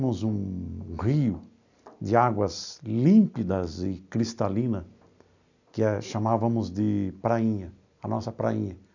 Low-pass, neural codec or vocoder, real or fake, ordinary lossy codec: 7.2 kHz; none; real; none